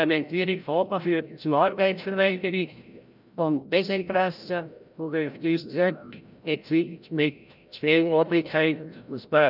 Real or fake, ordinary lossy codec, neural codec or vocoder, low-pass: fake; none; codec, 16 kHz, 0.5 kbps, FreqCodec, larger model; 5.4 kHz